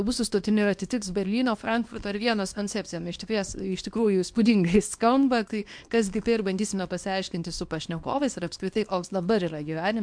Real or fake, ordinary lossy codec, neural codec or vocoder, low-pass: fake; MP3, 64 kbps; codec, 24 kHz, 0.9 kbps, WavTokenizer, small release; 9.9 kHz